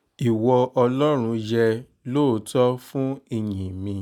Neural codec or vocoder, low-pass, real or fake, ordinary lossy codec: none; 19.8 kHz; real; none